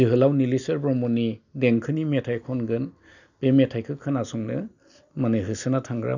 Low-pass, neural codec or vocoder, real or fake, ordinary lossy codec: 7.2 kHz; none; real; none